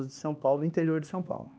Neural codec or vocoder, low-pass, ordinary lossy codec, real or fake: codec, 16 kHz, 2 kbps, X-Codec, HuBERT features, trained on LibriSpeech; none; none; fake